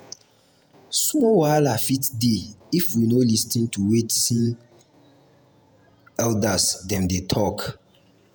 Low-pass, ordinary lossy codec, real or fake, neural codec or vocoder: none; none; fake; vocoder, 48 kHz, 128 mel bands, Vocos